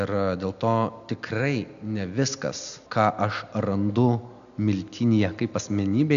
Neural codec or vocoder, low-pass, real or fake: none; 7.2 kHz; real